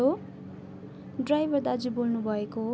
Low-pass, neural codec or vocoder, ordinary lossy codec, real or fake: none; none; none; real